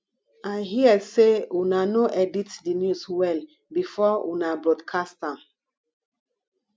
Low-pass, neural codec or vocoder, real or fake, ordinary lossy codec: none; none; real; none